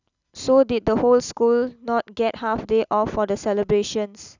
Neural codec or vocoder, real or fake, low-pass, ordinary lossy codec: none; real; 7.2 kHz; none